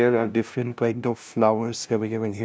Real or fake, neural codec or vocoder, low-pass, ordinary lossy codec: fake; codec, 16 kHz, 0.5 kbps, FunCodec, trained on LibriTTS, 25 frames a second; none; none